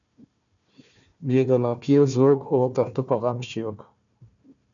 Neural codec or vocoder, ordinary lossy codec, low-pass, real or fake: codec, 16 kHz, 1 kbps, FunCodec, trained on Chinese and English, 50 frames a second; MP3, 96 kbps; 7.2 kHz; fake